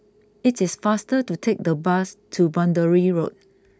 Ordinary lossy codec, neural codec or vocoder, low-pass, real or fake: none; none; none; real